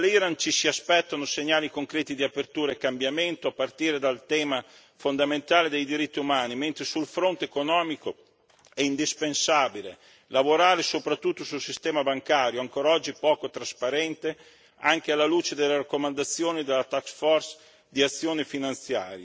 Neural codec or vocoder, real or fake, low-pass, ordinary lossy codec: none; real; none; none